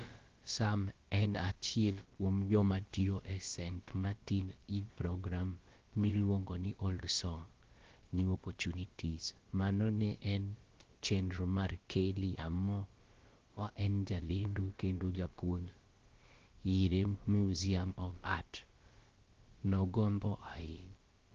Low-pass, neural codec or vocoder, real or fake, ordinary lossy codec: 7.2 kHz; codec, 16 kHz, about 1 kbps, DyCAST, with the encoder's durations; fake; Opus, 16 kbps